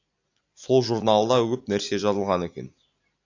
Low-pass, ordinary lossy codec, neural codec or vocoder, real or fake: 7.2 kHz; AAC, 48 kbps; none; real